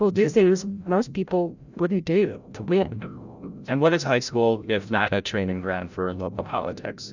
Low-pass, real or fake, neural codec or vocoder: 7.2 kHz; fake; codec, 16 kHz, 0.5 kbps, FreqCodec, larger model